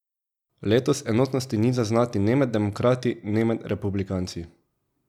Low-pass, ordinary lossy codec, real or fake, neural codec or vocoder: 19.8 kHz; none; real; none